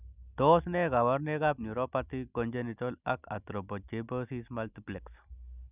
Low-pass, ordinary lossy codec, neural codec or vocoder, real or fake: 3.6 kHz; none; none; real